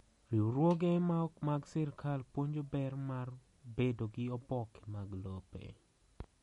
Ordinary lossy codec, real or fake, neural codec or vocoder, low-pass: MP3, 48 kbps; real; none; 19.8 kHz